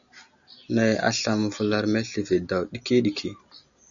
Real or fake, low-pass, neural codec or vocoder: real; 7.2 kHz; none